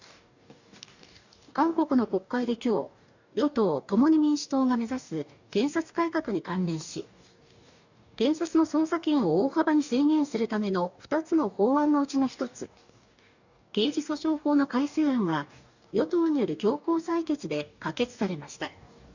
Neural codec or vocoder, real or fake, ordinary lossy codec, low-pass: codec, 44.1 kHz, 2.6 kbps, DAC; fake; none; 7.2 kHz